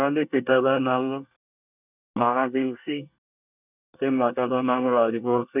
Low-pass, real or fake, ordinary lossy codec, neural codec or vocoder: 3.6 kHz; fake; none; codec, 24 kHz, 1 kbps, SNAC